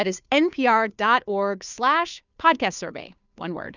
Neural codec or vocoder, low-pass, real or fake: codec, 16 kHz, 2 kbps, FunCodec, trained on Chinese and English, 25 frames a second; 7.2 kHz; fake